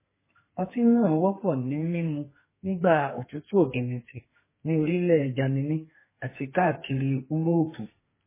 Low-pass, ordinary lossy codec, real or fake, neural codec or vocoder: 3.6 kHz; MP3, 16 kbps; fake; codec, 32 kHz, 1.9 kbps, SNAC